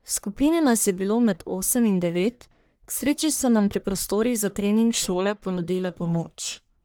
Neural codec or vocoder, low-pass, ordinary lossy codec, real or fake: codec, 44.1 kHz, 1.7 kbps, Pupu-Codec; none; none; fake